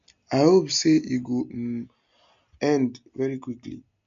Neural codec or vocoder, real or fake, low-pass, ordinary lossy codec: none; real; 7.2 kHz; MP3, 64 kbps